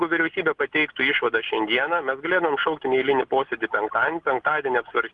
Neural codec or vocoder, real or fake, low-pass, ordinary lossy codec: none; real; 10.8 kHz; Opus, 32 kbps